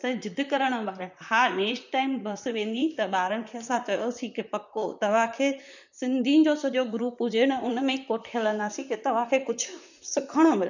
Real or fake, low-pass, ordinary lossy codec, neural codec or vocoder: fake; 7.2 kHz; none; vocoder, 44.1 kHz, 128 mel bands, Pupu-Vocoder